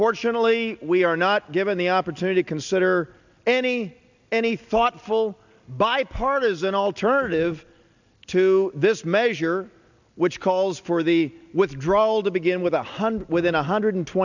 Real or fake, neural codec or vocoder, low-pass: real; none; 7.2 kHz